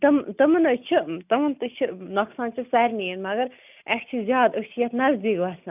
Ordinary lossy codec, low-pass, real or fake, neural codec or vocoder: none; 3.6 kHz; real; none